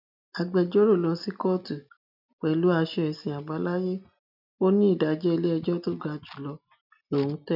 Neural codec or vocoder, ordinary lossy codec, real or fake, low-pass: none; none; real; 5.4 kHz